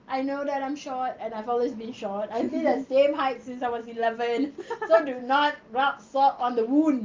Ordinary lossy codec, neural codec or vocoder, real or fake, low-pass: Opus, 32 kbps; none; real; 7.2 kHz